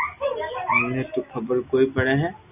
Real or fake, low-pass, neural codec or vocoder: real; 3.6 kHz; none